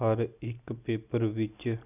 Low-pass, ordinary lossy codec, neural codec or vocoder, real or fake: 3.6 kHz; none; none; real